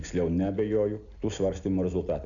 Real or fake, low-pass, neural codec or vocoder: real; 7.2 kHz; none